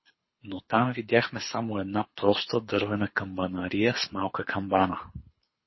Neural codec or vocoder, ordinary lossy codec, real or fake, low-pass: codec, 24 kHz, 6 kbps, HILCodec; MP3, 24 kbps; fake; 7.2 kHz